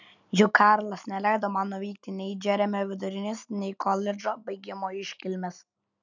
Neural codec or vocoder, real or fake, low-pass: none; real; 7.2 kHz